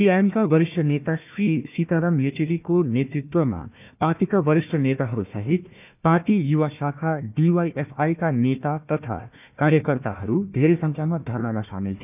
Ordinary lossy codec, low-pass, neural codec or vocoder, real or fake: none; 3.6 kHz; codec, 16 kHz, 1 kbps, FunCodec, trained on Chinese and English, 50 frames a second; fake